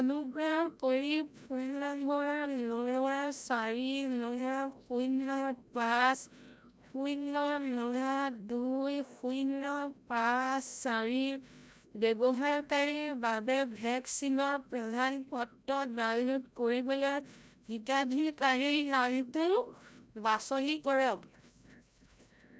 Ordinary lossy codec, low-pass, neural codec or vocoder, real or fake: none; none; codec, 16 kHz, 0.5 kbps, FreqCodec, larger model; fake